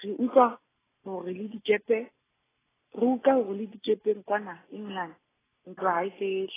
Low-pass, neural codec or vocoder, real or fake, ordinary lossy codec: 3.6 kHz; none; real; AAC, 16 kbps